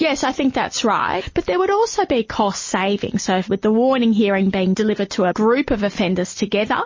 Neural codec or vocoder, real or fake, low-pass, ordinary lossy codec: none; real; 7.2 kHz; MP3, 32 kbps